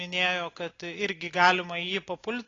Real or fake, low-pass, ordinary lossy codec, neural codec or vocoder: real; 7.2 kHz; AAC, 32 kbps; none